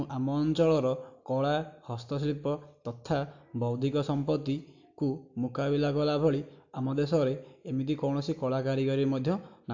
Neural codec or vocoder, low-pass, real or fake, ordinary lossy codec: none; 7.2 kHz; real; AAC, 48 kbps